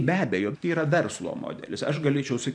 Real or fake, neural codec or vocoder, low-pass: fake; vocoder, 44.1 kHz, 128 mel bands every 512 samples, BigVGAN v2; 9.9 kHz